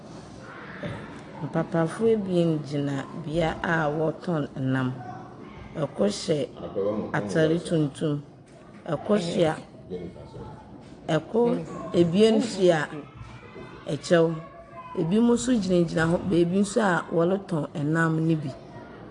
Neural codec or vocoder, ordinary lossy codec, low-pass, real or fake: none; AAC, 48 kbps; 9.9 kHz; real